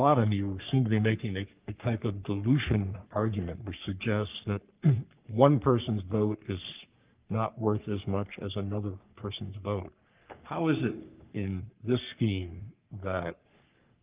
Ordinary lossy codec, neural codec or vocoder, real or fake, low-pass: Opus, 24 kbps; codec, 44.1 kHz, 3.4 kbps, Pupu-Codec; fake; 3.6 kHz